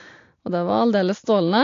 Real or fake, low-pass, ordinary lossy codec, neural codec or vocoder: real; 7.2 kHz; AAC, 48 kbps; none